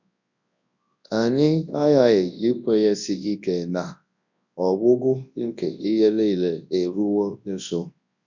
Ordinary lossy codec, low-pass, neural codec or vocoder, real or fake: none; 7.2 kHz; codec, 24 kHz, 0.9 kbps, WavTokenizer, large speech release; fake